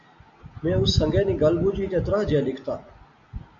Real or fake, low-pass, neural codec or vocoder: real; 7.2 kHz; none